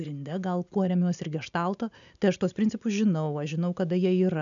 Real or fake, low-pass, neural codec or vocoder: real; 7.2 kHz; none